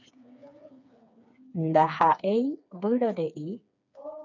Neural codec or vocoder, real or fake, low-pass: codec, 16 kHz, 4 kbps, FreqCodec, smaller model; fake; 7.2 kHz